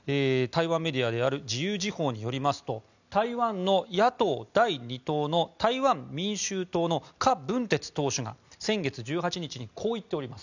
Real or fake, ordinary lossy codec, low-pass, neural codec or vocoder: real; none; 7.2 kHz; none